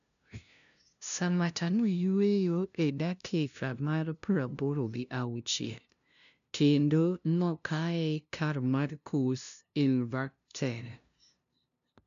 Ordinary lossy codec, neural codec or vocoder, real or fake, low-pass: none; codec, 16 kHz, 0.5 kbps, FunCodec, trained on LibriTTS, 25 frames a second; fake; 7.2 kHz